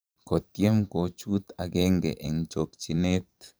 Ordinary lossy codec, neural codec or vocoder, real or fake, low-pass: none; vocoder, 44.1 kHz, 128 mel bands every 512 samples, BigVGAN v2; fake; none